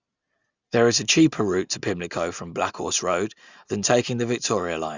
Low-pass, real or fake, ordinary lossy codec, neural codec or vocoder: 7.2 kHz; real; Opus, 64 kbps; none